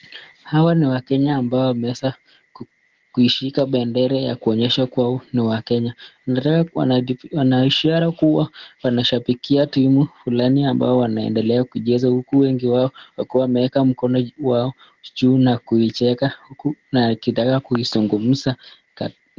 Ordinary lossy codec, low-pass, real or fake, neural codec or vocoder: Opus, 16 kbps; 7.2 kHz; real; none